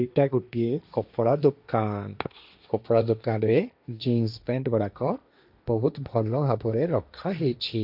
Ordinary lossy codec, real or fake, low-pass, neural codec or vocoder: none; fake; 5.4 kHz; codec, 16 kHz, 1.1 kbps, Voila-Tokenizer